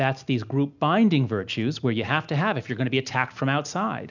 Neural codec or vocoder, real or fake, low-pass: none; real; 7.2 kHz